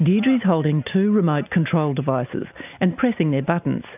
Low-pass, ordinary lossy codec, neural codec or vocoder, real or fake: 3.6 kHz; AAC, 32 kbps; none; real